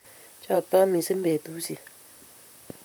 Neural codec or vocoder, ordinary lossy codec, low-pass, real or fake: vocoder, 44.1 kHz, 128 mel bands, Pupu-Vocoder; none; none; fake